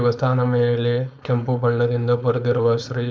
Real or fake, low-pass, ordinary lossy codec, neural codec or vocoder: fake; none; none; codec, 16 kHz, 4.8 kbps, FACodec